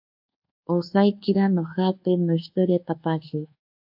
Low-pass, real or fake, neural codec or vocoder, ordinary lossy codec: 5.4 kHz; fake; codec, 24 kHz, 1.2 kbps, DualCodec; AAC, 48 kbps